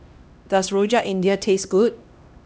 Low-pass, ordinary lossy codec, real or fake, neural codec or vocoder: none; none; fake; codec, 16 kHz, 1 kbps, X-Codec, HuBERT features, trained on LibriSpeech